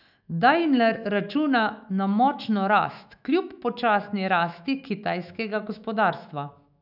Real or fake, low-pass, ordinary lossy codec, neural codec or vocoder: fake; 5.4 kHz; none; autoencoder, 48 kHz, 128 numbers a frame, DAC-VAE, trained on Japanese speech